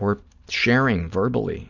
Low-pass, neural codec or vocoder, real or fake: 7.2 kHz; none; real